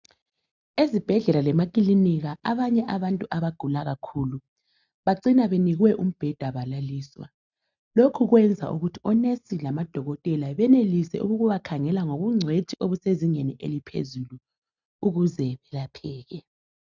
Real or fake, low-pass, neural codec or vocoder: real; 7.2 kHz; none